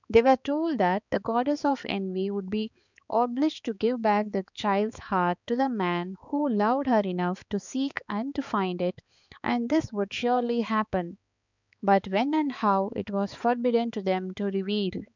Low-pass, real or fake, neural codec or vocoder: 7.2 kHz; fake; codec, 16 kHz, 4 kbps, X-Codec, HuBERT features, trained on balanced general audio